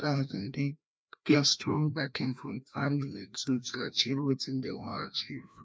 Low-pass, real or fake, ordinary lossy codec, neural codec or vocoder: none; fake; none; codec, 16 kHz, 1 kbps, FreqCodec, larger model